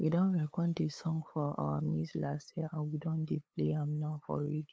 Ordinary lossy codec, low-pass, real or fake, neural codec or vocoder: none; none; fake; codec, 16 kHz, 8 kbps, FunCodec, trained on LibriTTS, 25 frames a second